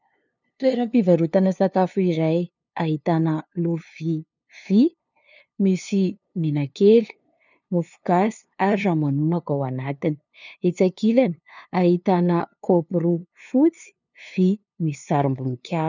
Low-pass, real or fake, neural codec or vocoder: 7.2 kHz; fake; codec, 16 kHz, 2 kbps, FunCodec, trained on LibriTTS, 25 frames a second